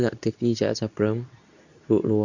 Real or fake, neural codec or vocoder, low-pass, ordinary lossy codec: fake; codec, 24 kHz, 0.9 kbps, WavTokenizer, medium speech release version 2; 7.2 kHz; none